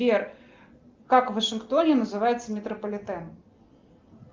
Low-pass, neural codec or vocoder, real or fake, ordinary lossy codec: 7.2 kHz; none; real; Opus, 32 kbps